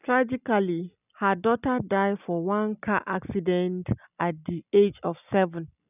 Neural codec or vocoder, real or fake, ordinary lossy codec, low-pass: none; real; none; 3.6 kHz